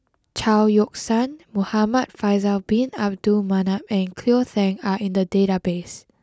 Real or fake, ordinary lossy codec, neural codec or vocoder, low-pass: real; none; none; none